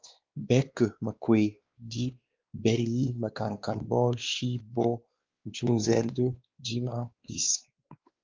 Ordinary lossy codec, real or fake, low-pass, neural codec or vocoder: Opus, 24 kbps; fake; 7.2 kHz; codec, 16 kHz, 2 kbps, X-Codec, WavLM features, trained on Multilingual LibriSpeech